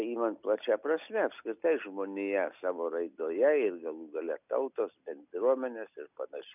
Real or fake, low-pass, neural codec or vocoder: real; 3.6 kHz; none